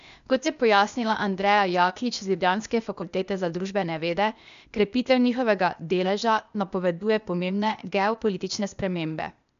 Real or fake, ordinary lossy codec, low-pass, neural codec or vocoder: fake; none; 7.2 kHz; codec, 16 kHz, 0.8 kbps, ZipCodec